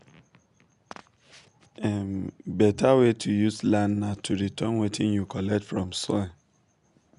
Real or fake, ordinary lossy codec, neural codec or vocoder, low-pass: real; MP3, 96 kbps; none; 10.8 kHz